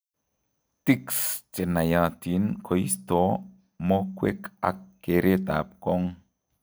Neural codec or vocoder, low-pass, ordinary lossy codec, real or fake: none; none; none; real